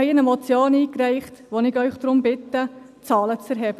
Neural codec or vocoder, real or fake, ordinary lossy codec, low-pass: none; real; none; 14.4 kHz